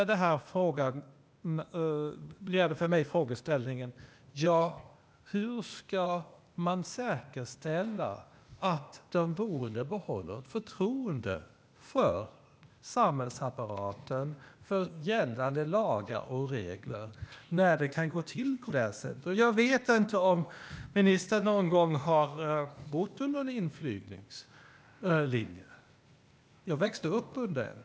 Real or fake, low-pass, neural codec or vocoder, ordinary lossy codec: fake; none; codec, 16 kHz, 0.8 kbps, ZipCodec; none